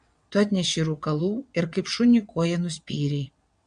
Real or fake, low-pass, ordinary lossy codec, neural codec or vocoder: fake; 9.9 kHz; MP3, 64 kbps; vocoder, 22.05 kHz, 80 mel bands, WaveNeXt